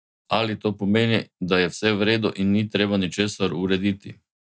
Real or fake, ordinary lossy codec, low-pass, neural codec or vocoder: real; none; none; none